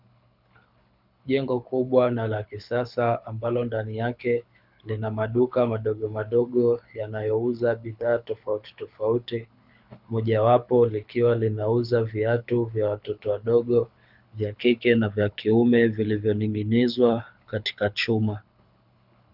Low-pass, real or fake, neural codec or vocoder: 5.4 kHz; fake; codec, 24 kHz, 6 kbps, HILCodec